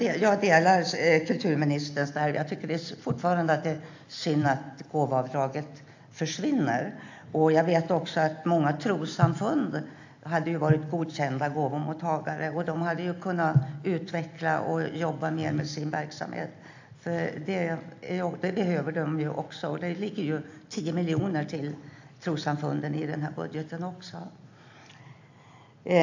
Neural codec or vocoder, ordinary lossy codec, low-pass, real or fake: none; AAC, 48 kbps; 7.2 kHz; real